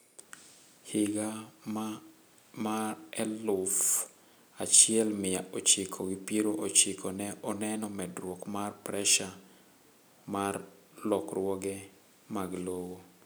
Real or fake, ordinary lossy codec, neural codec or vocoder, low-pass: real; none; none; none